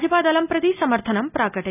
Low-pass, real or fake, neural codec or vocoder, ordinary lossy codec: 3.6 kHz; real; none; none